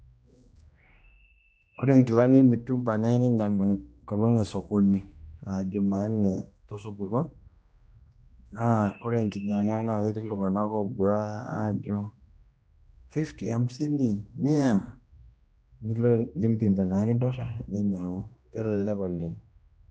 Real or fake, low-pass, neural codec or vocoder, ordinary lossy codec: fake; none; codec, 16 kHz, 1 kbps, X-Codec, HuBERT features, trained on general audio; none